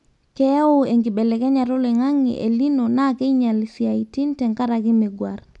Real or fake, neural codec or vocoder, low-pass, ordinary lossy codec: real; none; 10.8 kHz; none